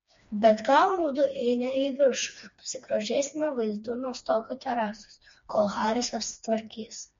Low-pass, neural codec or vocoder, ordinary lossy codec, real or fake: 7.2 kHz; codec, 16 kHz, 2 kbps, FreqCodec, smaller model; MP3, 48 kbps; fake